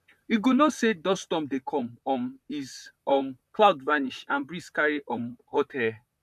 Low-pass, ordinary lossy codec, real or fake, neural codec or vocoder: 14.4 kHz; none; fake; vocoder, 44.1 kHz, 128 mel bands, Pupu-Vocoder